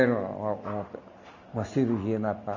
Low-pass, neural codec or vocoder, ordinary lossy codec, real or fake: 7.2 kHz; none; none; real